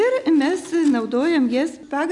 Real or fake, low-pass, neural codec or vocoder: real; 10.8 kHz; none